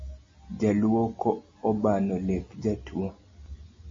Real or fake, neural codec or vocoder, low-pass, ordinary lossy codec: real; none; 7.2 kHz; MP3, 32 kbps